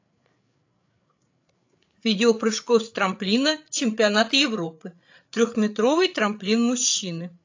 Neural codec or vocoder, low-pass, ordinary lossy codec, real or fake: codec, 16 kHz, 8 kbps, FreqCodec, larger model; 7.2 kHz; AAC, 48 kbps; fake